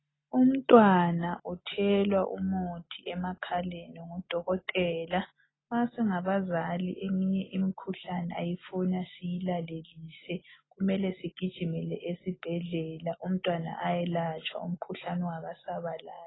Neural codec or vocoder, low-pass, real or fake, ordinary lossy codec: none; 7.2 kHz; real; AAC, 16 kbps